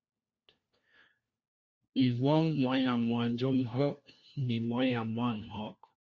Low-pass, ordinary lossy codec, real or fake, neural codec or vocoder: 7.2 kHz; Opus, 64 kbps; fake; codec, 16 kHz, 1 kbps, FunCodec, trained on LibriTTS, 50 frames a second